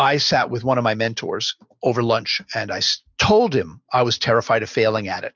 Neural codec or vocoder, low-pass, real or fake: none; 7.2 kHz; real